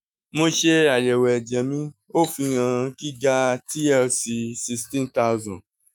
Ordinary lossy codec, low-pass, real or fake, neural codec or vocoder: none; none; fake; autoencoder, 48 kHz, 128 numbers a frame, DAC-VAE, trained on Japanese speech